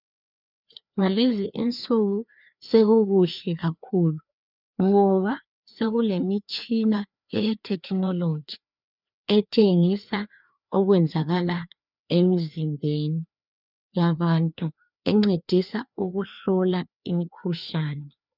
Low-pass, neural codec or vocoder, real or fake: 5.4 kHz; codec, 16 kHz, 2 kbps, FreqCodec, larger model; fake